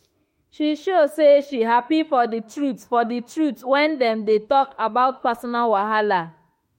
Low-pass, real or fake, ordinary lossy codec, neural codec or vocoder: 19.8 kHz; fake; MP3, 64 kbps; autoencoder, 48 kHz, 32 numbers a frame, DAC-VAE, trained on Japanese speech